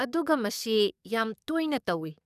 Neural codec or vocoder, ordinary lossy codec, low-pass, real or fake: codec, 44.1 kHz, 7.8 kbps, DAC; none; 14.4 kHz; fake